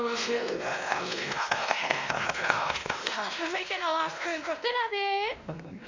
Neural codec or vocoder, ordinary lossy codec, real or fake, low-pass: codec, 16 kHz, 1 kbps, X-Codec, WavLM features, trained on Multilingual LibriSpeech; MP3, 64 kbps; fake; 7.2 kHz